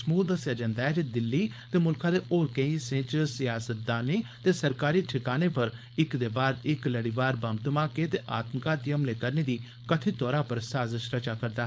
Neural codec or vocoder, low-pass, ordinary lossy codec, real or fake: codec, 16 kHz, 4.8 kbps, FACodec; none; none; fake